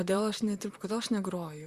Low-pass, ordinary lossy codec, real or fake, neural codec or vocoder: 14.4 kHz; Opus, 64 kbps; fake; vocoder, 44.1 kHz, 128 mel bands, Pupu-Vocoder